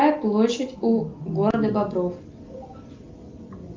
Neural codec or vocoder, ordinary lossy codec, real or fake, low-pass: none; Opus, 32 kbps; real; 7.2 kHz